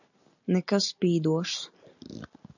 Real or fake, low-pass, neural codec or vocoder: real; 7.2 kHz; none